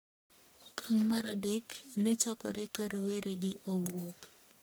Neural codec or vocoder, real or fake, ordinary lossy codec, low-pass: codec, 44.1 kHz, 1.7 kbps, Pupu-Codec; fake; none; none